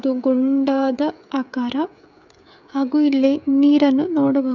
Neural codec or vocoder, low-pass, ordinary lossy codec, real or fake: codec, 16 kHz, 16 kbps, FreqCodec, smaller model; 7.2 kHz; none; fake